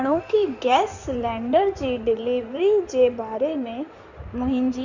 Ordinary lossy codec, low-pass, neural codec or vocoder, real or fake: none; 7.2 kHz; codec, 16 kHz in and 24 kHz out, 2.2 kbps, FireRedTTS-2 codec; fake